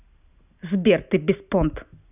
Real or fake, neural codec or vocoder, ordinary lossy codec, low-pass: real; none; none; 3.6 kHz